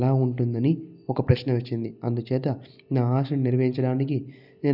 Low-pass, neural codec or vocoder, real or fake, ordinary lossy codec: 5.4 kHz; none; real; none